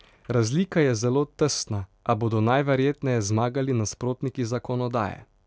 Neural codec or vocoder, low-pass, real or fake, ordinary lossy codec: none; none; real; none